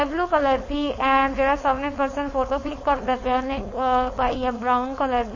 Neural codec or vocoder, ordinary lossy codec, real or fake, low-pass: codec, 16 kHz, 4.8 kbps, FACodec; MP3, 32 kbps; fake; 7.2 kHz